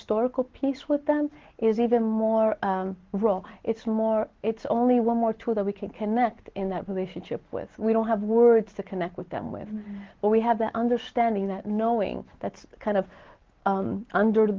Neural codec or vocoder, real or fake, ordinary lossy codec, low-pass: none; real; Opus, 16 kbps; 7.2 kHz